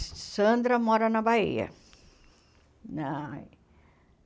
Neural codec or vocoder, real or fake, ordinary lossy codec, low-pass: none; real; none; none